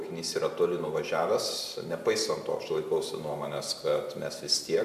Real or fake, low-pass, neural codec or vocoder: fake; 14.4 kHz; vocoder, 44.1 kHz, 128 mel bands every 256 samples, BigVGAN v2